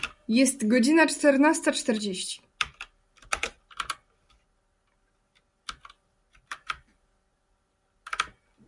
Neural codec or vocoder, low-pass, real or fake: none; 10.8 kHz; real